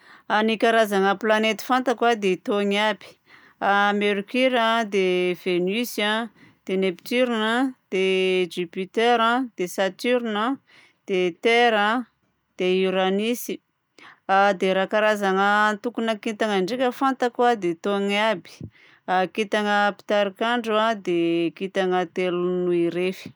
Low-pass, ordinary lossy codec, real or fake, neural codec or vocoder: none; none; real; none